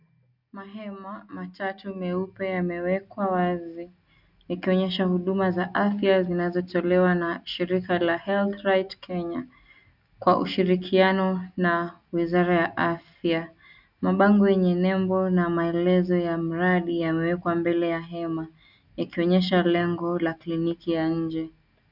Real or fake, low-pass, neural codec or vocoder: real; 5.4 kHz; none